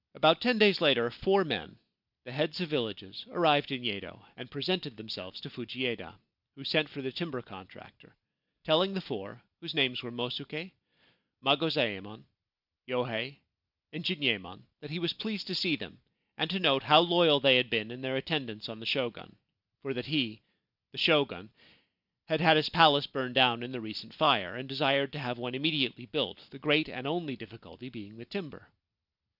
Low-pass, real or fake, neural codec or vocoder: 5.4 kHz; real; none